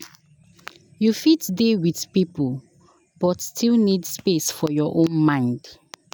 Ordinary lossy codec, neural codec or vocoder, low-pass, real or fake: none; none; none; real